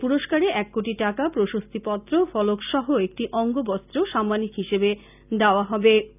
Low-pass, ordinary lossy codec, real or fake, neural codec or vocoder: 3.6 kHz; none; real; none